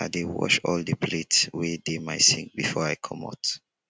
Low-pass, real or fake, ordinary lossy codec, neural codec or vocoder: none; real; none; none